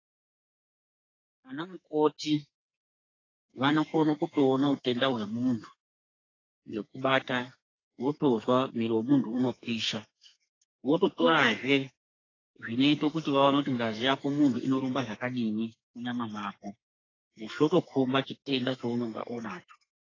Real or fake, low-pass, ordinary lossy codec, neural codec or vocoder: fake; 7.2 kHz; AAC, 32 kbps; codec, 32 kHz, 1.9 kbps, SNAC